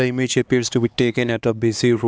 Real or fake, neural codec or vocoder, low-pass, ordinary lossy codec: fake; codec, 16 kHz, 2 kbps, X-Codec, HuBERT features, trained on LibriSpeech; none; none